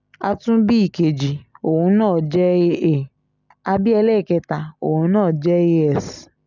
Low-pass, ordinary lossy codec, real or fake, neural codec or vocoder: 7.2 kHz; none; real; none